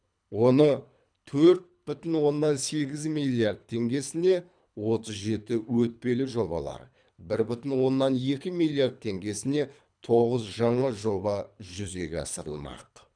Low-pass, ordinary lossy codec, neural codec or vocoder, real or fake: 9.9 kHz; none; codec, 24 kHz, 3 kbps, HILCodec; fake